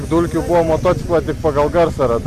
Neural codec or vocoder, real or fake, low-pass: none; real; 14.4 kHz